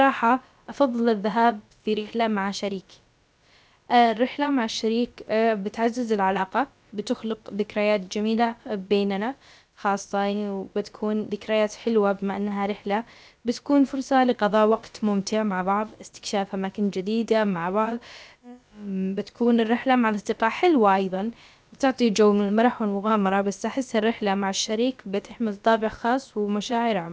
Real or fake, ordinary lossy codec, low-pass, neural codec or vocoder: fake; none; none; codec, 16 kHz, about 1 kbps, DyCAST, with the encoder's durations